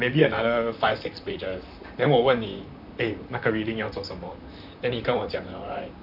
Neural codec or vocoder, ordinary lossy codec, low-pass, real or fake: vocoder, 44.1 kHz, 128 mel bands, Pupu-Vocoder; none; 5.4 kHz; fake